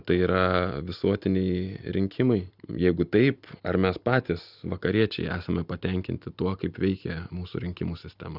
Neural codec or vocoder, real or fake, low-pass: none; real; 5.4 kHz